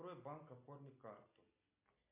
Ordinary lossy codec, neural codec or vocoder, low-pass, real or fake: MP3, 32 kbps; none; 3.6 kHz; real